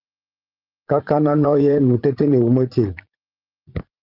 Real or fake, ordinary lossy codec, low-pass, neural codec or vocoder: fake; Opus, 24 kbps; 5.4 kHz; vocoder, 44.1 kHz, 80 mel bands, Vocos